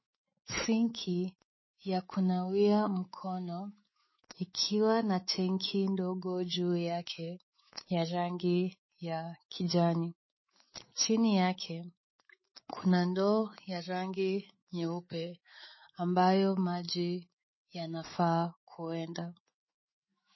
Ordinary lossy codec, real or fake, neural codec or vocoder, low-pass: MP3, 24 kbps; fake; autoencoder, 48 kHz, 128 numbers a frame, DAC-VAE, trained on Japanese speech; 7.2 kHz